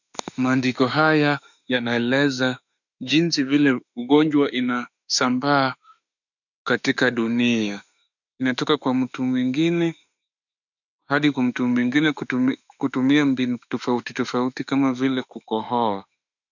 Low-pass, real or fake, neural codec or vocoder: 7.2 kHz; fake; autoencoder, 48 kHz, 32 numbers a frame, DAC-VAE, trained on Japanese speech